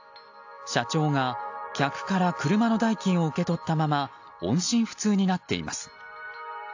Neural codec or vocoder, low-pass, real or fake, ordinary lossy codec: none; 7.2 kHz; real; AAC, 48 kbps